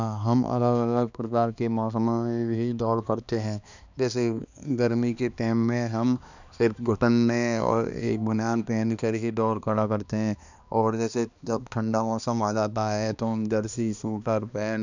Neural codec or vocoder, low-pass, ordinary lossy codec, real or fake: codec, 16 kHz, 2 kbps, X-Codec, HuBERT features, trained on balanced general audio; 7.2 kHz; none; fake